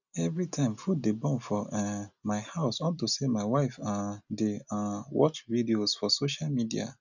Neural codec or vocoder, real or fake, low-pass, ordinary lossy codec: none; real; 7.2 kHz; none